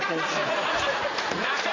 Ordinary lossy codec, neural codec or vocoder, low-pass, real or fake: AAC, 32 kbps; vocoder, 44.1 kHz, 80 mel bands, Vocos; 7.2 kHz; fake